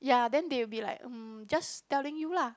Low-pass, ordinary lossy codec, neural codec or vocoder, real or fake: none; none; none; real